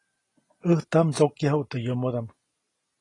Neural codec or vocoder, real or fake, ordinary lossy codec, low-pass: none; real; AAC, 32 kbps; 10.8 kHz